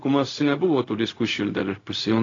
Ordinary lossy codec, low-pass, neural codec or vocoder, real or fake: AAC, 32 kbps; 7.2 kHz; codec, 16 kHz, 0.4 kbps, LongCat-Audio-Codec; fake